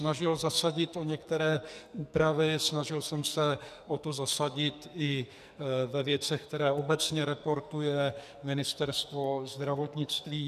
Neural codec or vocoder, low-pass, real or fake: codec, 44.1 kHz, 2.6 kbps, SNAC; 14.4 kHz; fake